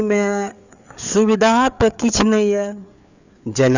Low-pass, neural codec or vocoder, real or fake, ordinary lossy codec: 7.2 kHz; codec, 16 kHz, 4 kbps, FreqCodec, larger model; fake; none